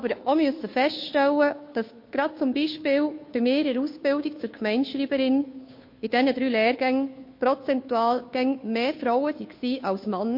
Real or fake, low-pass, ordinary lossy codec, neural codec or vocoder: fake; 5.4 kHz; MP3, 32 kbps; codec, 16 kHz, 2 kbps, FunCodec, trained on Chinese and English, 25 frames a second